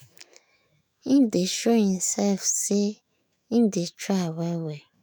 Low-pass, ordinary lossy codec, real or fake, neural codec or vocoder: none; none; fake; autoencoder, 48 kHz, 128 numbers a frame, DAC-VAE, trained on Japanese speech